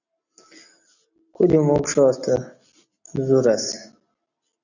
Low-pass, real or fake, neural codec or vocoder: 7.2 kHz; real; none